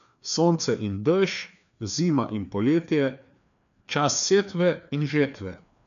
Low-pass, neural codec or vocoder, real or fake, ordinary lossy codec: 7.2 kHz; codec, 16 kHz, 2 kbps, FreqCodec, larger model; fake; none